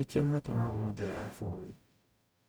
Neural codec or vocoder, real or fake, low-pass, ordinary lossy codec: codec, 44.1 kHz, 0.9 kbps, DAC; fake; none; none